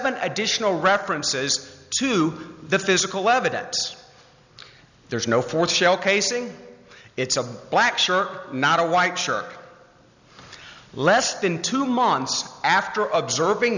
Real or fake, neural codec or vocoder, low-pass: real; none; 7.2 kHz